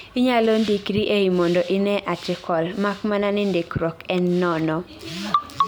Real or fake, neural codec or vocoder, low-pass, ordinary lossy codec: real; none; none; none